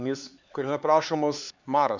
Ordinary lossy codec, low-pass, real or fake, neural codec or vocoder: Opus, 64 kbps; 7.2 kHz; fake; codec, 16 kHz, 4 kbps, X-Codec, HuBERT features, trained on LibriSpeech